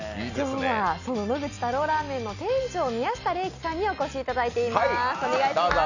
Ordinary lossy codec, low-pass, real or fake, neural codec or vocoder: none; 7.2 kHz; real; none